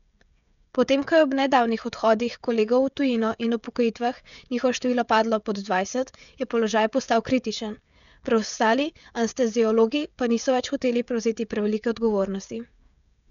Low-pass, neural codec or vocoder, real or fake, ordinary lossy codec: 7.2 kHz; codec, 16 kHz, 16 kbps, FreqCodec, smaller model; fake; none